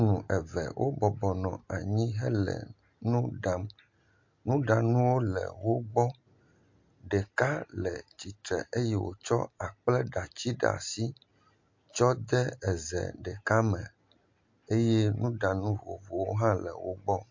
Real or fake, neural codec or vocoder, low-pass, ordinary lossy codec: real; none; 7.2 kHz; MP3, 48 kbps